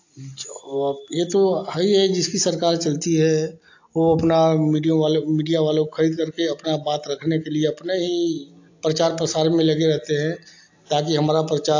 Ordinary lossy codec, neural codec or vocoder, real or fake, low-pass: AAC, 48 kbps; none; real; 7.2 kHz